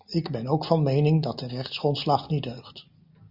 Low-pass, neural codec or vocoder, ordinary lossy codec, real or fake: 5.4 kHz; none; Opus, 64 kbps; real